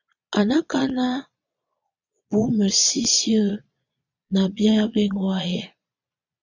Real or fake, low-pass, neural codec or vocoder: fake; 7.2 kHz; vocoder, 22.05 kHz, 80 mel bands, Vocos